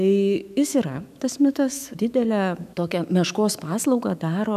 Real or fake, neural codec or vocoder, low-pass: fake; autoencoder, 48 kHz, 128 numbers a frame, DAC-VAE, trained on Japanese speech; 14.4 kHz